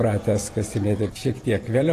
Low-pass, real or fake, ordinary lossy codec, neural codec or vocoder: 14.4 kHz; fake; AAC, 48 kbps; vocoder, 48 kHz, 128 mel bands, Vocos